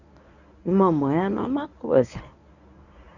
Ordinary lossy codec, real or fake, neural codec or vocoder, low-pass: none; fake; codec, 24 kHz, 0.9 kbps, WavTokenizer, medium speech release version 1; 7.2 kHz